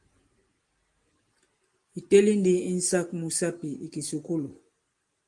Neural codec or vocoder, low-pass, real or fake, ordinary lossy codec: none; 10.8 kHz; real; Opus, 24 kbps